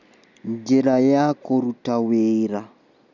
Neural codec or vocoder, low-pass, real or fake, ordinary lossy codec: none; 7.2 kHz; real; none